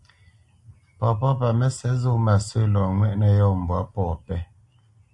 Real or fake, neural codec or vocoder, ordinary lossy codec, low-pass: real; none; AAC, 64 kbps; 10.8 kHz